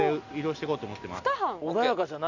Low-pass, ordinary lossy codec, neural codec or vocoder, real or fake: 7.2 kHz; none; none; real